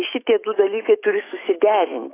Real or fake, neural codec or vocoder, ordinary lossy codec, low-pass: fake; autoencoder, 48 kHz, 128 numbers a frame, DAC-VAE, trained on Japanese speech; AAC, 16 kbps; 3.6 kHz